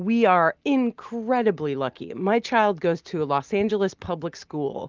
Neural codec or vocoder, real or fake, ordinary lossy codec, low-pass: none; real; Opus, 24 kbps; 7.2 kHz